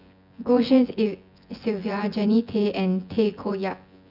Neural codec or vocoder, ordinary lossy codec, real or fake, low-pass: vocoder, 24 kHz, 100 mel bands, Vocos; none; fake; 5.4 kHz